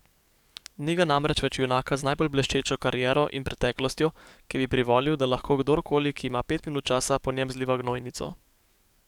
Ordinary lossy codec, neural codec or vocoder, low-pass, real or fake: none; codec, 44.1 kHz, 7.8 kbps, DAC; 19.8 kHz; fake